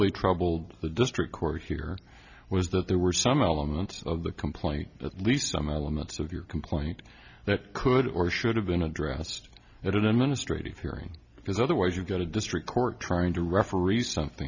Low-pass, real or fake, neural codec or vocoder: 7.2 kHz; real; none